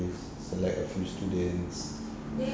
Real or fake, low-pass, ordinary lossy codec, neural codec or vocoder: real; none; none; none